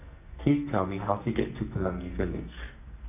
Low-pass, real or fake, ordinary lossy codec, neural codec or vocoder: 3.6 kHz; fake; none; codec, 44.1 kHz, 2.6 kbps, SNAC